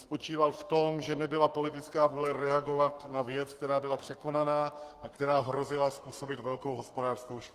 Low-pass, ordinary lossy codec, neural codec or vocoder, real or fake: 14.4 kHz; Opus, 24 kbps; codec, 32 kHz, 1.9 kbps, SNAC; fake